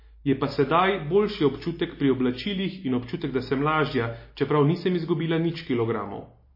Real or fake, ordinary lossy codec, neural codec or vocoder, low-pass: real; MP3, 24 kbps; none; 5.4 kHz